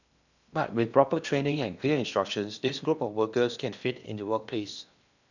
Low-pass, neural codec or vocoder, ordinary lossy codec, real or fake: 7.2 kHz; codec, 16 kHz in and 24 kHz out, 0.8 kbps, FocalCodec, streaming, 65536 codes; none; fake